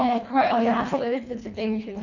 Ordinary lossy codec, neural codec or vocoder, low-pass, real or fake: none; codec, 24 kHz, 1.5 kbps, HILCodec; 7.2 kHz; fake